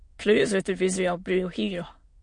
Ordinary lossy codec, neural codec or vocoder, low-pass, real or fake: MP3, 48 kbps; autoencoder, 22.05 kHz, a latent of 192 numbers a frame, VITS, trained on many speakers; 9.9 kHz; fake